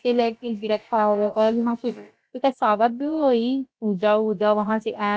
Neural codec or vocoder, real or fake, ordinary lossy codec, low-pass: codec, 16 kHz, about 1 kbps, DyCAST, with the encoder's durations; fake; none; none